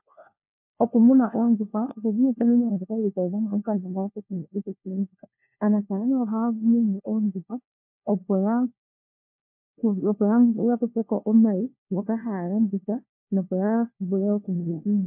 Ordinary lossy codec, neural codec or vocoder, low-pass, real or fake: MP3, 32 kbps; codec, 16 kHz, 1 kbps, FunCodec, trained on LibriTTS, 50 frames a second; 3.6 kHz; fake